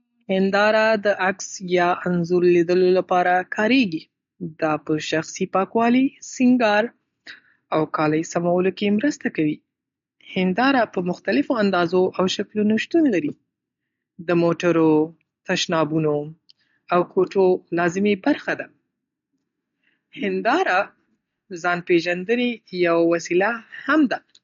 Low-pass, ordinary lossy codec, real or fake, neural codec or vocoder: 7.2 kHz; MP3, 48 kbps; real; none